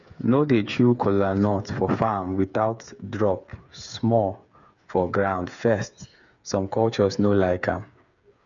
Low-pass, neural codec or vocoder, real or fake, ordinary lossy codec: 7.2 kHz; codec, 16 kHz, 8 kbps, FreqCodec, smaller model; fake; none